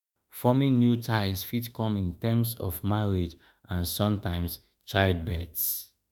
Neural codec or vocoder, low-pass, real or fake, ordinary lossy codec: autoencoder, 48 kHz, 32 numbers a frame, DAC-VAE, trained on Japanese speech; none; fake; none